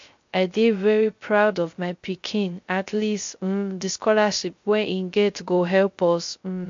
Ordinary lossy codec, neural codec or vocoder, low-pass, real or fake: MP3, 48 kbps; codec, 16 kHz, 0.3 kbps, FocalCodec; 7.2 kHz; fake